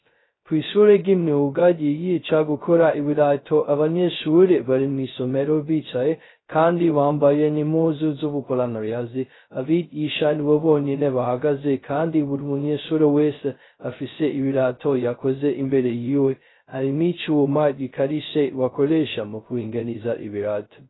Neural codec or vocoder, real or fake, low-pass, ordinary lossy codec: codec, 16 kHz, 0.2 kbps, FocalCodec; fake; 7.2 kHz; AAC, 16 kbps